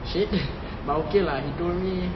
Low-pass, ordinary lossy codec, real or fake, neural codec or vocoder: 7.2 kHz; MP3, 24 kbps; real; none